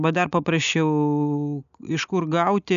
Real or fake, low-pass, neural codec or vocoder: real; 7.2 kHz; none